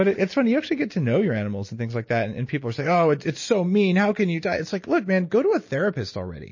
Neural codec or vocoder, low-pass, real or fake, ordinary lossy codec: none; 7.2 kHz; real; MP3, 32 kbps